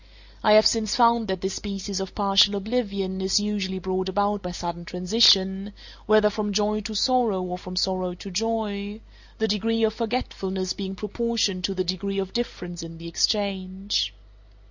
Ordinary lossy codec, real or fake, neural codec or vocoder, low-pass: Opus, 64 kbps; real; none; 7.2 kHz